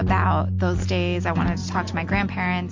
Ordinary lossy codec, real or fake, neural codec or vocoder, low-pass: MP3, 48 kbps; real; none; 7.2 kHz